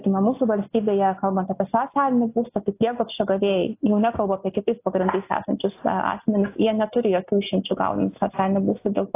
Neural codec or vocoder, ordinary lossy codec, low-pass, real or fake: none; AAC, 24 kbps; 3.6 kHz; real